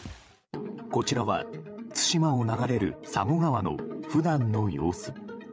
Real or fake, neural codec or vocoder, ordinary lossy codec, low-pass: fake; codec, 16 kHz, 8 kbps, FreqCodec, larger model; none; none